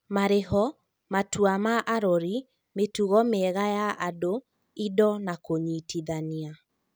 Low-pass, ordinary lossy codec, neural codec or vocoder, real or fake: none; none; none; real